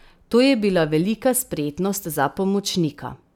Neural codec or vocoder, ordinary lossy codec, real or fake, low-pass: none; none; real; 19.8 kHz